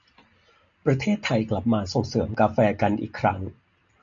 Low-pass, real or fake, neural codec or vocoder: 7.2 kHz; real; none